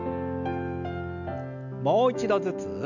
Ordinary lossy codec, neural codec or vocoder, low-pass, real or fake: none; none; 7.2 kHz; real